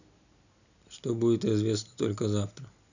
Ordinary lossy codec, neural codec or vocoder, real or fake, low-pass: none; none; real; 7.2 kHz